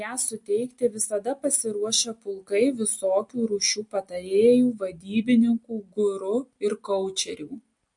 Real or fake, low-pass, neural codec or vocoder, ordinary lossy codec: real; 10.8 kHz; none; MP3, 48 kbps